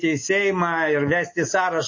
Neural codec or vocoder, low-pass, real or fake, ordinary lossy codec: none; 7.2 kHz; real; MP3, 32 kbps